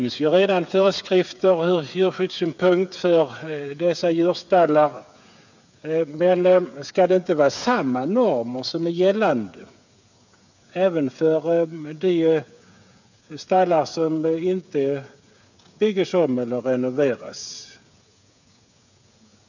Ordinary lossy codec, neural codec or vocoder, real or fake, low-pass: none; codec, 16 kHz, 8 kbps, FreqCodec, smaller model; fake; 7.2 kHz